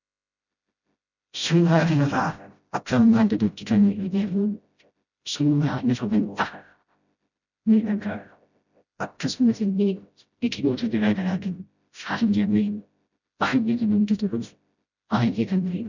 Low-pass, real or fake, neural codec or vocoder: 7.2 kHz; fake; codec, 16 kHz, 0.5 kbps, FreqCodec, smaller model